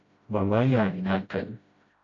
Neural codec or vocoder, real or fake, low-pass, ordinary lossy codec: codec, 16 kHz, 0.5 kbps, FreqCodec, smaller model; fake; 7.2 kHz; AAC, 48 kbps